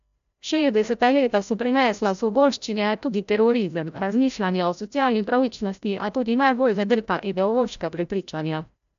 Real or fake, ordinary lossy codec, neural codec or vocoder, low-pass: fake; AAC, 96 kbps; codec, 16 kHz, 0.5 kbps, FreqCodec, larger model; 7.2 kHz